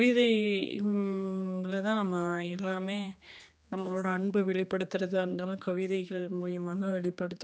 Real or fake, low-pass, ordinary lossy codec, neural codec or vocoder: fake; none; none; codec, 16 kHz, 2 kbps, X-Codec, HuBERT features, trained on general audio